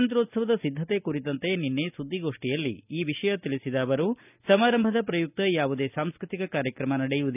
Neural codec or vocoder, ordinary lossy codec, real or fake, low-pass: none; none; real; 3.6 kHz